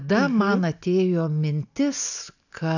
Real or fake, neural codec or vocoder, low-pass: real; none; 7.2 kHz